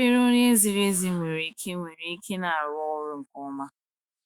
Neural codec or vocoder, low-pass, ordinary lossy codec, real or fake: autoencoder, 48 kHz, 128 numbers a frame, DAC-VAE, trained on Japanese speech; 19.8 kHz; Opus, 64 kbps; fake